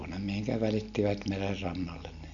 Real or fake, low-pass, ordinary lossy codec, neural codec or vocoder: real; 7.2 kHz; none; none